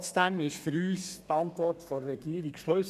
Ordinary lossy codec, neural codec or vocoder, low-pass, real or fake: none; codec, 32 kHz, 1.9 kbps, SNAC; 14.4 kHz; fake